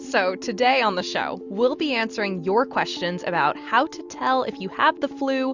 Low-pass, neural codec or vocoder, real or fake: 7.2 kHz; none; real